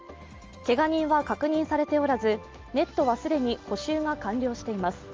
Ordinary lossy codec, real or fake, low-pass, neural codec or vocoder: Opus, 24 kbps; real; 7.2 kHz; none